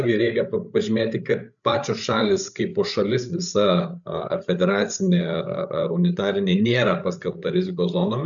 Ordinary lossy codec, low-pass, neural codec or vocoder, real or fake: MP3, 96 kbps; 7.2 kHz; codec, 16 kHz, 8 kbps, FreqCodec, larger model; fake